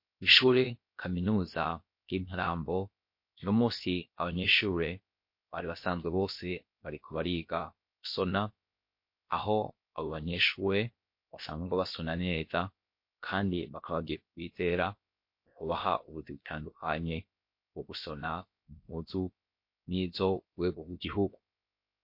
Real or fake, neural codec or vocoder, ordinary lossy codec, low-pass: fake; codec, 16 kHz, about 1 kbps, DyCAST, with the encoder's durations; MP3, 32 kbps; 5.4 kHz